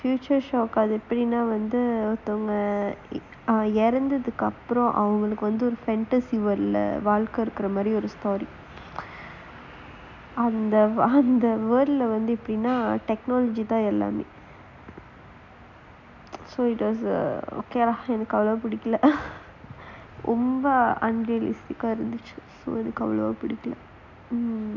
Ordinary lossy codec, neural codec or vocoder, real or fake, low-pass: none; none; real; 7.2 kHz